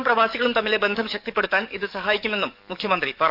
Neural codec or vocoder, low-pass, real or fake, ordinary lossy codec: codec, 44.1 kHz, 7.8 kbps, DAC; 5.4 kHz; fake; none